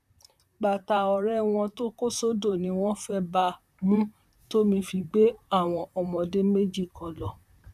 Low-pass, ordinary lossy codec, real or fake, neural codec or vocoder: 14.4 kHz; none; fake; vocoder, 44.1 kHz, 128 mel bands, Pupu-Vocoder